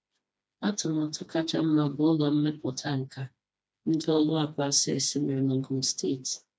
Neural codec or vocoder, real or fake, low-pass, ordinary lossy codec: codec, 16 kHz, 2 kbps, FreqCodec, smaller model; fake; none; none